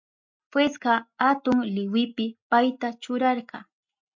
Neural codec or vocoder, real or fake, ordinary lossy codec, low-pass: none; real; MP3, 48 kbps; 7.2 kHz